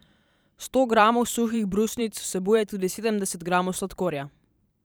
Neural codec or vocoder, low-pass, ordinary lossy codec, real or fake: vocoder, 44.1 kHz, 128 mel bands every 512 samples, BigVGAN v2; none; none; fake